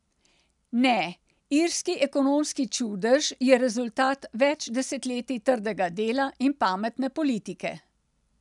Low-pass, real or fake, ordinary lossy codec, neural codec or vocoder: 10.8 kHz; real; none; none